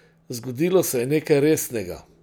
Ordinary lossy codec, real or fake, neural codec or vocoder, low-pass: none; real; none; none